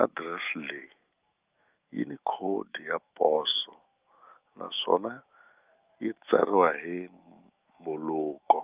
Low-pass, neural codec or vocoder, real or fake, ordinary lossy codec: 3.6 kHz; autoencoder, 48 kHz, 128 numbers a frame, DAC-VAE, trained on Japanese speech; fake; Opus, 32 kbps